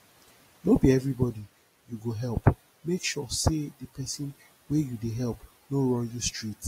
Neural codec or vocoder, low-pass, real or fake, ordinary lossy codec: none; 19.8 kHz; real; AAC, 48 kbps